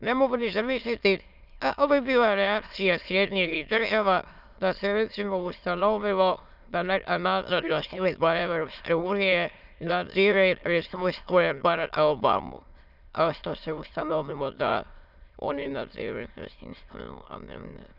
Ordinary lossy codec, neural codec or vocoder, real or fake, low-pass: none; autoencoder, 22.05 kHz, a latent of 192 numbers a frame, VITS, trained on many speakers; fake; 5.4 kHz